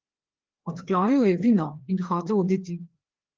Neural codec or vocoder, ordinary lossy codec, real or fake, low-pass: codec, 16 kHz, 2 kbps, FreqCodec, larger model; Opus, 16 kbps; fake; 7.2 kHz